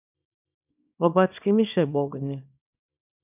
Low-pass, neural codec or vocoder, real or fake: 3.6 kHz; codec, 24 kHz, 0.9 kbps, WavTokenizer, small release; fake